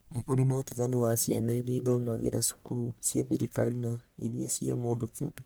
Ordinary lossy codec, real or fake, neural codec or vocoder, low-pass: none; fake; codec, 44.1 kHz, 1.7 kbps, Pupu-Codec; none